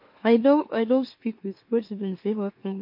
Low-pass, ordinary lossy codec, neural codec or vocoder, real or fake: 5.4 kHz; MP3, 32 kbps; autoencoder, 44.1 kHz, a latent of 192 numbers a frame, MeloTTS; fake